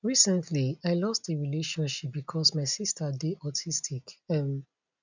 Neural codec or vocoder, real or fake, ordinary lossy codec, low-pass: none; real; none; 7.2 kHz